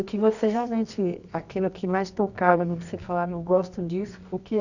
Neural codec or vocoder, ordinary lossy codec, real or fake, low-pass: codec, 24 kHz, 0.9 kbps, WavTokenizer, medium music audio release; none; fake; 7.2 kHz